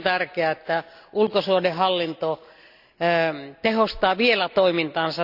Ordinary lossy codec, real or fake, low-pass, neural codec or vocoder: none; real; 5.4 kHz; none